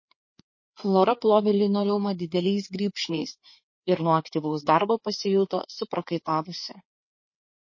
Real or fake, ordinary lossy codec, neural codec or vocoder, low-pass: fake; MP3, 32 kbps; codec, 16 kHz, 4 kbps, FreqCodec, larger model; 7.2 kHz